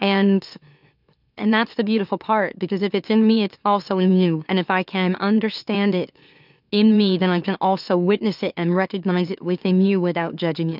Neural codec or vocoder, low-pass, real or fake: autoencoder, 44.1 kHz, a latent of 192 numbers a frame, MeloTTS; 5.4 kHz; fake